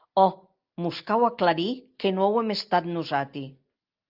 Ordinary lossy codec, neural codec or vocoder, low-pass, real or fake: Opus, 24 kbps; none; 5.4 kHz; real